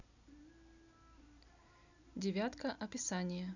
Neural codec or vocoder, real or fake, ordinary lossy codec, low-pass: none; real; none; 7.2 kHz